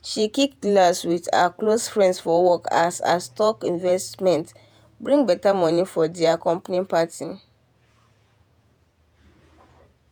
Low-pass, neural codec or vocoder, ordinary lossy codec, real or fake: none; vocoder, 48 kHz, 128 mel bands, Vocos; none; fake